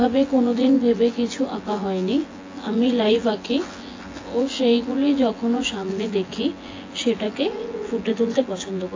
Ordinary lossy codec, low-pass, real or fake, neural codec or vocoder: AAC, 32 kbps; 7.2 kHz; fake; vocoder, 24 kHz, 100 mel bands, Vocos